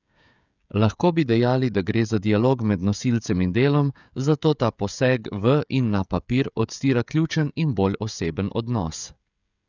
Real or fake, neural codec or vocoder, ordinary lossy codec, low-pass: fake; codec, 16 kHz, 16 kbps, FreqCodec, smaller model; none; 7.2 kHz